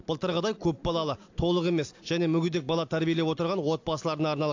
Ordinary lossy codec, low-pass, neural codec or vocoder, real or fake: AAC, 48 kbps; 7.2 kHz; none; real